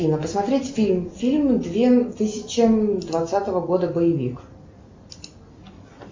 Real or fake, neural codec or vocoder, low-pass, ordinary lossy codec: real; none; 7.2 kHz; MP3, 64 kbps